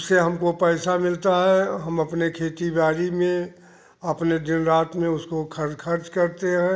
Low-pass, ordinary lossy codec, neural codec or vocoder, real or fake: none; none; none; real